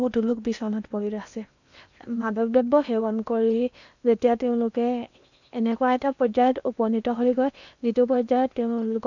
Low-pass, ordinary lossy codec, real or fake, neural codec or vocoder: 7.2 kHz; none; fake; codec, 16 kHz in and 24 kHz out, 0.8 kbps, FocalCodec, streaming, 65536 codes